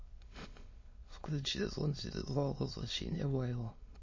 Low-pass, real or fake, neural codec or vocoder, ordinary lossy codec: 7.2 kHz; fake; autoencoder, 22.05 kHz, a latent of 192 numbers a frame, VITS, trained on many speakers; MP3, 32 kbps